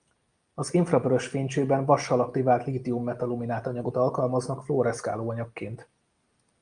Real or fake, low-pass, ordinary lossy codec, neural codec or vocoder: real; 9.9 kHz; Opus, 32 kbps; none